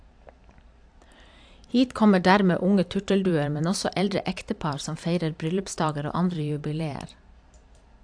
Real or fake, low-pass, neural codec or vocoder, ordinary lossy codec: fake; 9.9 kHz; vocoder, 44.1 kHz, 128 mel bands every 512 samples, BigVGAN v2; AAC, 64 kbps